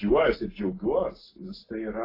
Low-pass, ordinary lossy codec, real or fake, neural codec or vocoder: 5.4 kHz; AAC, 24 kbps; real; none